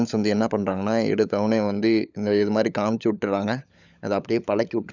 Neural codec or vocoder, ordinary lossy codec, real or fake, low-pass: codec, 16 kHz, 16 kbps, FunCodec, trained on LibriTTS, 50 frames a second; none; fake; 7.2 kHz